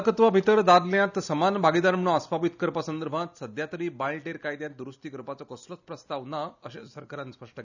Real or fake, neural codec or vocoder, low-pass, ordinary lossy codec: real; none; 7.2 kHz; none